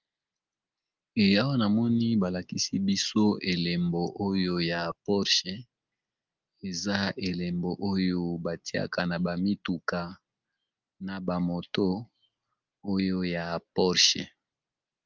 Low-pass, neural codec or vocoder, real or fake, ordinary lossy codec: 7.2 kHz; none; real; Opus, 24 kbps